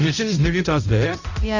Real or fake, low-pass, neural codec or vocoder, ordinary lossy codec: fake; 7.2 kHz; codec, 16 kHz, 0.5 kbps, X-Codec, HuBERT features, trained on balanced general audio; none